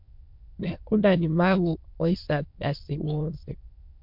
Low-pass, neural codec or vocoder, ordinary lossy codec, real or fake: 5.4 kHz; autoencoder, 22.05 kHz, a latent of 192 numbers a frame, VITS, trained on many speakers; MP3, 48 kbps; fake